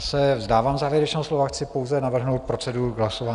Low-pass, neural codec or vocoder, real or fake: 10.8 kHz; none; real